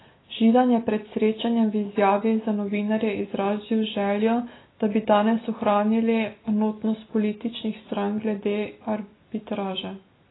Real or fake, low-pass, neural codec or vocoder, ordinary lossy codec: real; 7.2 kHz; none; AAC, 16 kbps